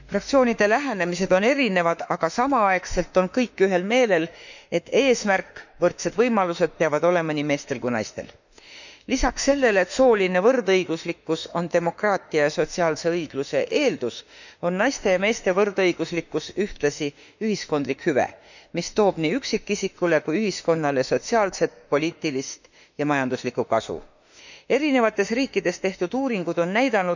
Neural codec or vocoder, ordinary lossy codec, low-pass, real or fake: autoencoder, 48 kHz, 32 numbers a frame, DAC-VAE, trained on Japanese speech; none; 7.2 kHz; fake